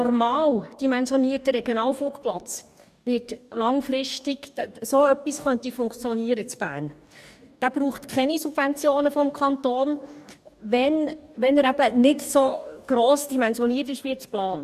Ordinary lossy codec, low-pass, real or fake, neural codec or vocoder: none; 14.4 kHz; fake; codec, 44.1 kHz, 2.6 kbps, DAC